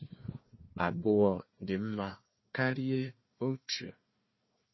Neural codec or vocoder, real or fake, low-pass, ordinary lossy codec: codec, 16 kHz, 1 kbps, FunCodec, trained on Chinese and English, 50 frames a second; fake; 7.2 kHz; MP3, 24 kbps